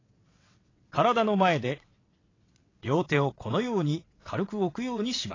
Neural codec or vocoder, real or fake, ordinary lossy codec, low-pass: vocoder, 22.05 kHz, 80 mel bands, WaveNeXt; fake; AAC, 32 kbps; 7.2 kHz